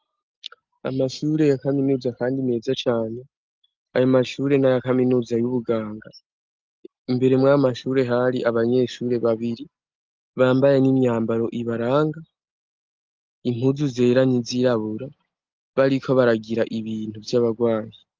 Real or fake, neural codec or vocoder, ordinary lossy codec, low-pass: real; none; Opus, 32 kbps; 7.2 kHz